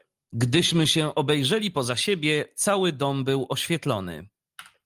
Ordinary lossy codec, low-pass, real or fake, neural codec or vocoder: Opus, 32 kbps; 14.4 kHz; real; none